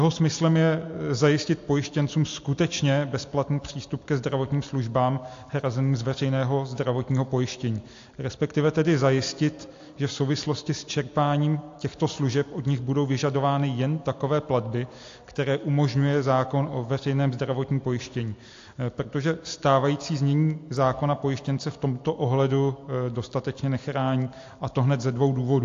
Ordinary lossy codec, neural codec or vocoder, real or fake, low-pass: AAC, 48 kbps; none; real; 7.2 kHz